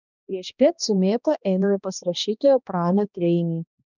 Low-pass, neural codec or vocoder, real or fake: 7.2 kHz; codec, 16 kHz, 1 kbps, X-Codec, HuBERT features, trained on balanced general audio; fake